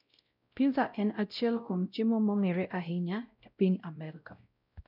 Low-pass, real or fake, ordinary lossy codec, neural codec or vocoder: 5.4 kHz; fake; none; codec, 16 kHz, 0.5 kbps, X-Codec, WavLM features, trained on Multilingual LibriSpeech